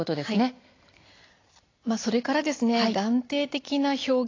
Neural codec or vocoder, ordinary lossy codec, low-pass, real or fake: none; AAC, 32 kbps; 7.2 kHz; real